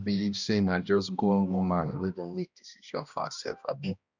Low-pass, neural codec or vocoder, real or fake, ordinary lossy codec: 7.2 kHz; codec, 16 kHz, 1 kbps, X-Codec, HuBERT features, trained on balanced general audio; fake; none